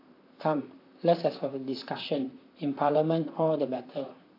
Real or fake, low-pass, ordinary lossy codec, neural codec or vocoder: fake; 5.4 kHz; AAC, 32 kbps; vocoder, 44.1 kHz, 128 mel bands, Pupu-Vocoder